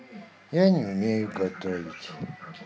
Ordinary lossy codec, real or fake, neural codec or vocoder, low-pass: none; real; none; none